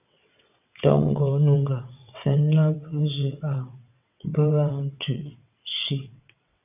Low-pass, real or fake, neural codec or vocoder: 3.6 kHz; fake; vocoder, 22.05 kHz, 80 mel bands, WaveNeXt